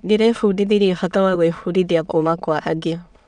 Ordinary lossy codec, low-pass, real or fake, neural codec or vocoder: none; 9.9 kHz; fake; autoencoder, 22.05 kHz, a latent of 192 numbers a frame, VITS, trained on many speakers